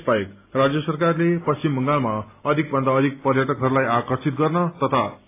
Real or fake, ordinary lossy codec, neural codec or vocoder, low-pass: real; AAC, 24 kbps; none; 3.6 kHz